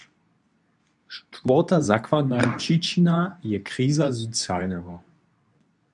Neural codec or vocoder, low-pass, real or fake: codec, 24 kHz, 0.9 kbps, WavTokenizer, medium speech release version 2; 10.8 kHz; fake